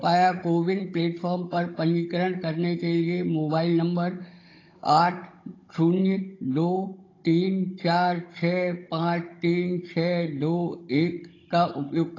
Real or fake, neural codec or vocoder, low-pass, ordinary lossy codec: fake; codec, 16 kHz, 16 kbps, FunCodec, trained on Chinese and English, 50 frames a second; 7.2 kHz; AAC, 32 kbps